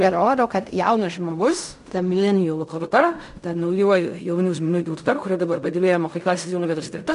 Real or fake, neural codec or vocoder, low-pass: fake; codec, 16 kHz in and 24 kHz out, 0.4 kbps, LongCat-Audio-Codec, fine tuned four codebook decoder; 10.8 kHz